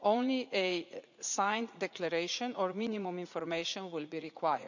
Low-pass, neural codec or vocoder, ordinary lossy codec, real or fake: 7.2 kHz; vocoder, 44.1 kHz, 80 mel bands, Vocos; none; fake